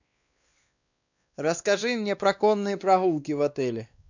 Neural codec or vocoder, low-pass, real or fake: codec, 16 kHz, 2 kbps, X-Codec, WavLM features, trained on Multilingual LibriSpeech; 7.2 kHz; fake